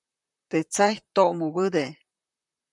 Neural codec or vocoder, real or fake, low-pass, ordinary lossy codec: vocoder, 44.1 kHz, 128 mel bands, Pupu-Vocoder; fake; 10.8 kHz; MP3, 96 kbps